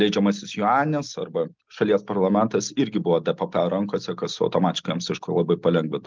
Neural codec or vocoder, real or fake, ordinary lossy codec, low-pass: none; real; Opus, 32 kbps; 7.2 kHz